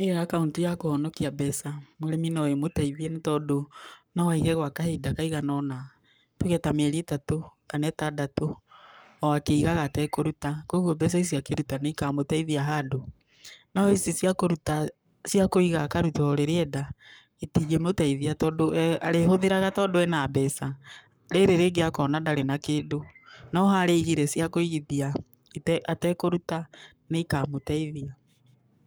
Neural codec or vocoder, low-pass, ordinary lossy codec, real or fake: codec, 44.1 kHz, 7.8 kbps, Pupu-Codec; none; none; fake